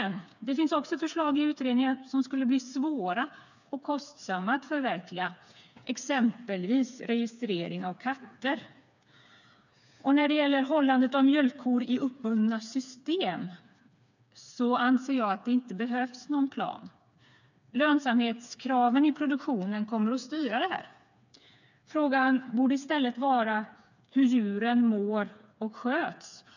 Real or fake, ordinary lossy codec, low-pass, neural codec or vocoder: fake; none; 7.2 kHz; codec, 16 kHz, 4 kbps, FreqCodec, smaller model